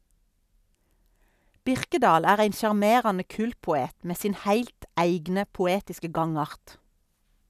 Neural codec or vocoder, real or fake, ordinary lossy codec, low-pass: none; real; none; 14.4 kHz